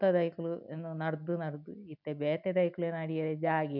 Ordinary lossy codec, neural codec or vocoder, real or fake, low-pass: none; none; real; 5.4 kHz